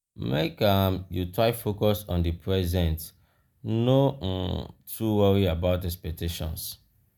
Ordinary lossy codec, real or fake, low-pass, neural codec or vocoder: none; real; none; none